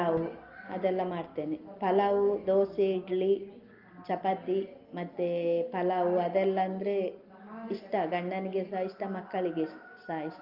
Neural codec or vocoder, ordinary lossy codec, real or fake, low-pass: none; Opus, 24 kbps; real; 5.4 kHz